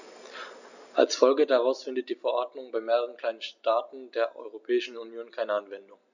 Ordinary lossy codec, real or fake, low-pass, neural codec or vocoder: none; real; 7.2 kHz; none